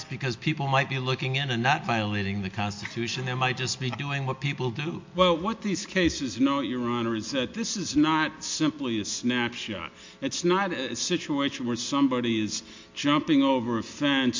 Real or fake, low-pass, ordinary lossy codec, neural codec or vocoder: real; 7.2 kHz; MP3, 48 kbps; none